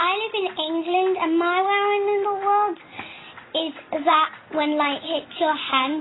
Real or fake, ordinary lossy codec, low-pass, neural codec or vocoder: real; AAC, 16 kbps; 7.2 kHz; none